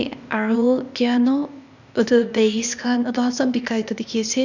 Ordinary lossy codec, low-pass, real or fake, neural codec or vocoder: none; 7.2 kHz; fake; codec, 16 kHz, 0.8 kbps, ZipCodec